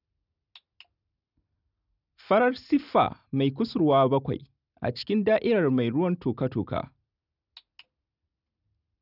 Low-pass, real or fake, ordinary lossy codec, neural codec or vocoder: 5.4 kHz; real; none; none